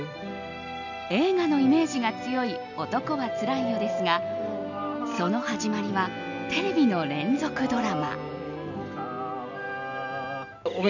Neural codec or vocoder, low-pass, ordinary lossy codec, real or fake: none; 7.2 kHz; none; real